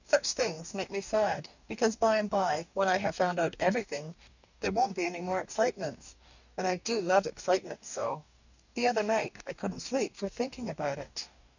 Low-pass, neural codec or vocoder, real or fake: 7.2 kHz; codec, 44.1 kHz, 2.6 kbps, DAC; fake